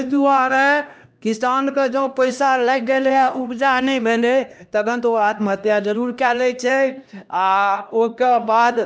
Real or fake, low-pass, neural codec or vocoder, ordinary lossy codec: fake; none; codec, 16 kHz, 1 kbps, X-Codec, HuBERT features, trained on LibriSpeech; none